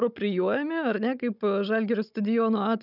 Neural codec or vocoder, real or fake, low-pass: codec, 16 kHz, 16 kbps, FunCodec, trained on Chinese and English, 50 frames a second; fake; 5.4 kHz